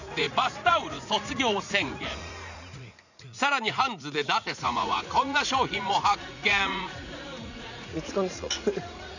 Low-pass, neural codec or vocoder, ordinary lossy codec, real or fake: 7.2 kHz; vocoder, 44.1 kHz, 80 mel bands, Vocos; none; fake